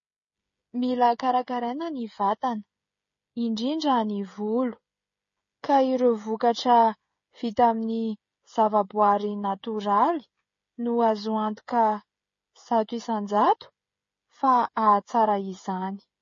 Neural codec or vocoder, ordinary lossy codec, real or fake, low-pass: codec, 16 kHz, 16 kbps, FreqCodec, smaller model; MP3, 32 kbps; fake; 7.2 kHz